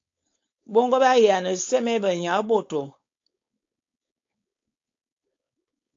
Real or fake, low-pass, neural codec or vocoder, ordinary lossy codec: fake; 7.2 kHz; codec, 16 kHz, 4.8 kbps, FACodec; AAC, 48 kbps